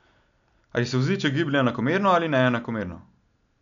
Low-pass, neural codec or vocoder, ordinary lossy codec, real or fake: 7.2 kHz; none; none; real